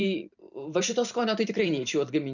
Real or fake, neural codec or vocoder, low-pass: fake; vocoder, 44.1 kHz, 128 mel bands every 512 samples, BigVGAN v2; 7.2 kHz